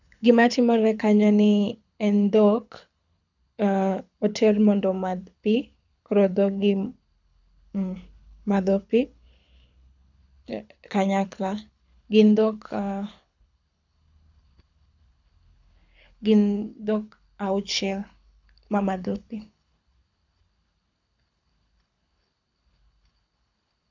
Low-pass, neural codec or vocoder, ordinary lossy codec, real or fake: 7.2 kHz; codec, 24 kHz, 6 kbps, HILCodec; none; fake